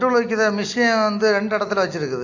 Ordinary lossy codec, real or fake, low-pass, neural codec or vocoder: AAC, 48 kbps; real; 7.2 kHz; none